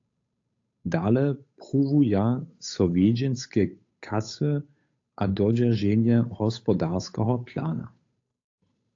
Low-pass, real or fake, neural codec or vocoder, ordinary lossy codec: 7.2 kHz; fake; codec, 16 kHz, 8 kbps, FunCodec, trained on Chinese and English, 25 frames a second; AAC, 48 kbps